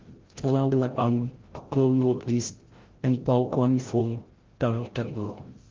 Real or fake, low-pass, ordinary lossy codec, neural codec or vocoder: fake; 7.2 kHz; Opus, 16 kbps; codec, 16 kHz, 0.5 kbps, FreqCodec, larger model